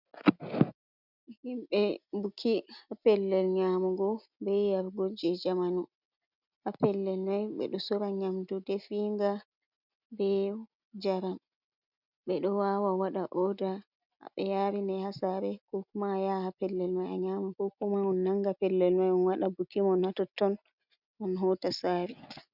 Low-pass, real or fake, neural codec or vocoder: 5.4 kHz; real; none